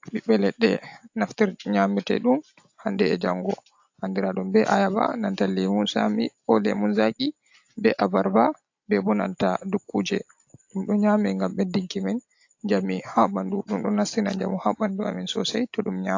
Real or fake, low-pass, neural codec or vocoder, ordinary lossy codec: real; 7.2 kHz; none; AAC, 48 kbps